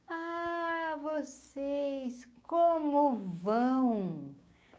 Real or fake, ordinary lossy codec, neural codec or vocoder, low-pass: fake; none; codec, 16 kHz, 6 kbps, DAC; none